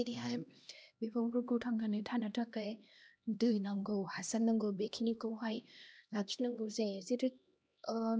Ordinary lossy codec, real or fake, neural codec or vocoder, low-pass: none; fake; codec, 16 kHz, 1 kbps, X-Codec, HuBERT features, trained on LibriSpeech; none